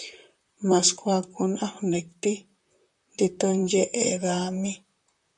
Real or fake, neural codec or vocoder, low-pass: fake; vocoder, 44.1 kHz, 128 mel bands, Pupu-Vocoder; 10.8 kHz